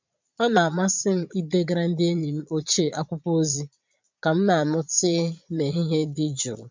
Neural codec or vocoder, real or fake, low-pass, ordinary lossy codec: vocoder, 22.05 kHz, 80 mel bands, Vocos; fake; 7.2 kHz; MP3, 64 kbps